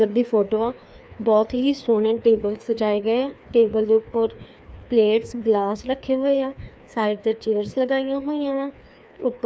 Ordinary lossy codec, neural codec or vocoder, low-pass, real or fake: none; codec, 16 kHz, 2 kbps, FreqCodec, larger model; none; fake